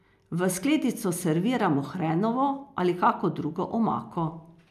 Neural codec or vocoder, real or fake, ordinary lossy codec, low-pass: none; real; MP3, 96 kbps; 14.4 kHz